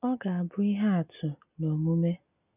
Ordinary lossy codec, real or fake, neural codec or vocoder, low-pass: none; real; none; 3.6 kHz